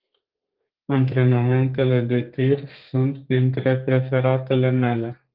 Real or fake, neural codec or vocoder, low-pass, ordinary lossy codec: fake; codec, 32 kHz, 1.9 kbps, SNAC; 5.4 kHz; Opus, 24 kbps